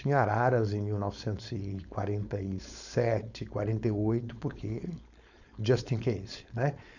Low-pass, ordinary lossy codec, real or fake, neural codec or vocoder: 7.2 kHz; none; fake; codec, 16 kHz, 4.8 kbps, FACodec